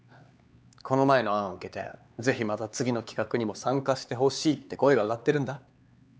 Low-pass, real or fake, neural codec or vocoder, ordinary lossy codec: none; fake; codec, 16 kHz, 4 kbps, X-Codec, HuBERT features, trained on LibriSpeech; none